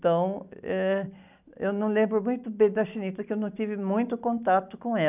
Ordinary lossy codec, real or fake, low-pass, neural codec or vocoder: none; real; 3.6 kHz; none